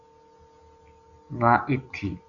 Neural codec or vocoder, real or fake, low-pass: none; real; 7.2 kHz